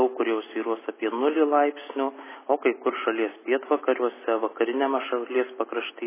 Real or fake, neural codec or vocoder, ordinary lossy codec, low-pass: real; none; MP3, 16 kbps; 3.6 kHz